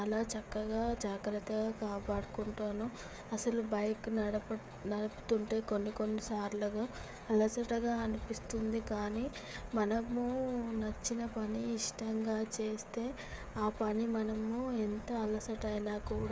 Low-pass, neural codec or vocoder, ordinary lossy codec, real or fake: none; codec, 16 kHz, 16 kbps, FreqCodec, smaller model; none; fake